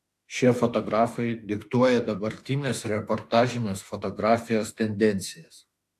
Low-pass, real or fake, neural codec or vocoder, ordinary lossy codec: 14.4 kHz; fake; autoencoder, 48 kHz, 32 numbers a frame, DAC-VAE, trained on Japanese speech; AAC, 64 kbps